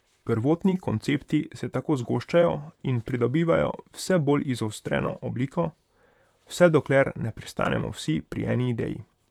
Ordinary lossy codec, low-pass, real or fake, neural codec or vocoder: none; 19.8 kHz; fake; vocoder, 44.1 kHz, 128 mel bands, Pupu-Vocoder